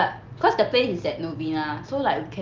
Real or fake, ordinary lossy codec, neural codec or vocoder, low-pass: real; Opus, 24 kbps; none; 7.2 kHz